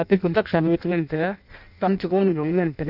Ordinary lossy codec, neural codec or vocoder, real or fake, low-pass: none; codec, 16 kHz in and 24 kHz out, 0.6 kbps, FireRedTTS-2 codec; fake; 5.4 kHz